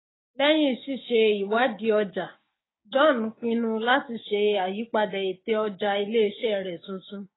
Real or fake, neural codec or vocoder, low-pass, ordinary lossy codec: fake; codec, 16 kHz, 6 kbps, DAC; 7.2 kHz; AAC, 16 kbps